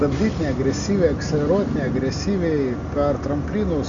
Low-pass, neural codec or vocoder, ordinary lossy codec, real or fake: 7.2 kHz; none; Opus, 64 kbps; real